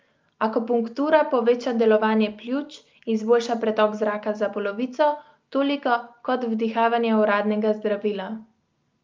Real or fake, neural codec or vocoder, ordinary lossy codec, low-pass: real; none; Opus, 24 kbps; 7.2 kHz